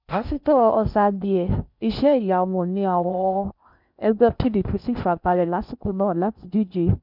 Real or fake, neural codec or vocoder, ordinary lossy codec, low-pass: fake; codec, 16 kHz in and 24 kHz out, 0.6 kbps, FocalCodec, streaming, 4096 codes; none; 5.4 kHz